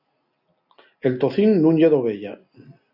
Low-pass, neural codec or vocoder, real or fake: 5.4 kHz; none; real